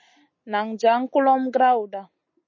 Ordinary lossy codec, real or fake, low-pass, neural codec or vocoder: MP3, 32 kbps; real; 7.2 kHz; none